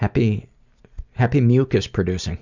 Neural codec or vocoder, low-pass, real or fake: none; 7.2 kHz; real